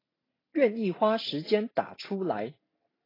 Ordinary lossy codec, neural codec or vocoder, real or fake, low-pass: AAC, 24 kbps; none; real; 5.4 kHz